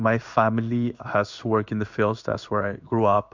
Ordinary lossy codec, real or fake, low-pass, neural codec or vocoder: MP3, 64 kbps; fake; 7.2 kHz; codec, 16 kHz in and 24 kHz out, 1 kbps, XY-Tokenizer